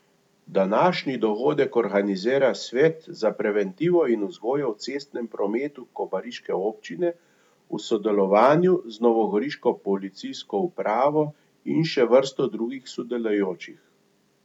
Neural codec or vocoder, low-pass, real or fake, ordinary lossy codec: none; 19.8 kHz; real; none